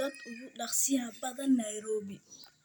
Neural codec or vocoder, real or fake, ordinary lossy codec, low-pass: none; real; none; none